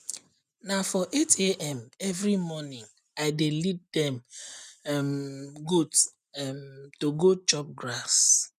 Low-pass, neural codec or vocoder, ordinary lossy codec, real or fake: 14.4 kHz; none; none; real